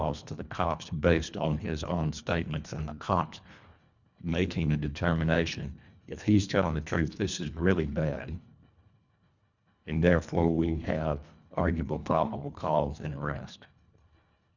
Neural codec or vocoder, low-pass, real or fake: codec, 24 kHz, 1.5 kbps, HILCodec; 7.2 kHz; fake